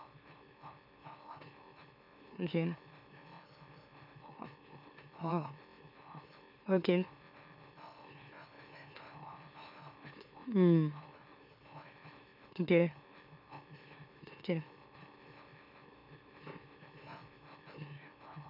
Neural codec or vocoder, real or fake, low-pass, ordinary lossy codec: autoencoder, 44.1 kHz, a latent of 192 numbers a frame, MeloTTS; fake; 5.4 kHz; none